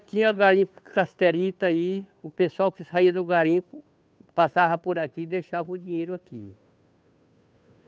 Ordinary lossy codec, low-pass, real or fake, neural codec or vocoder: none; none; fake; codec, 16 kHz, 2 kbps, FunCodec, trained on Chinese and English, 25 frames a second